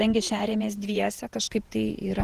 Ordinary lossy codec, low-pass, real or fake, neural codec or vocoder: Opus, 16 kbps; 14.4 kHz; fake; vocoder, 48 kHz, 128 mel bands, Vocos